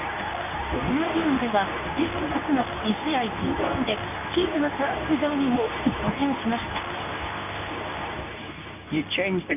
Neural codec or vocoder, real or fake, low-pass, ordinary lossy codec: codec, 24 kHz, 0.9 kbps, WavTokenizer, medium speech release version 2; fake; 3.6 kHz; none